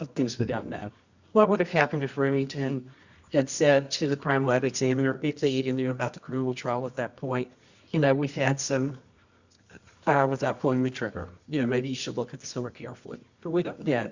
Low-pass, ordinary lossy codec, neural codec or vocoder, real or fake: 7.2 kHz; Opus, 64 kbps; codec, 24 kHz, 0.9 kbps, WavTokenizer, medium music audio release; fake